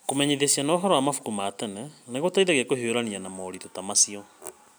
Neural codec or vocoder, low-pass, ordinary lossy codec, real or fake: none; none; none; real